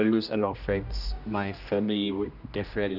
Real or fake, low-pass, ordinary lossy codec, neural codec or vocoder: fake; 5.4 kHz; none; codec, 16 kHz, 1 kbps, X-Codec, HuBERT features, trained on general audio